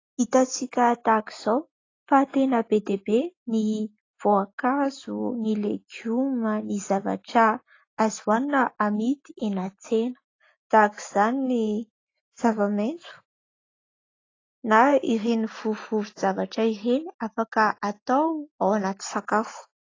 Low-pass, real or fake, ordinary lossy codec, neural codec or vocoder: 7.2 kHz; real; AAC, 32 kbps; none